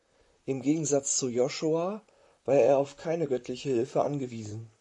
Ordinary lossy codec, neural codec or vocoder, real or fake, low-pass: AAC, 64 kbps; vocoder, 44.1 kHz, 128 mel bands, Pupu-Vocoder; fake; 10.8 kHz